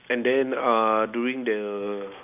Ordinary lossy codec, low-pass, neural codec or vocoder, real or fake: none; 3.6 kHz; none; real